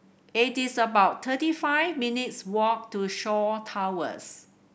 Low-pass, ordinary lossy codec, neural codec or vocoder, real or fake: none; none; none; real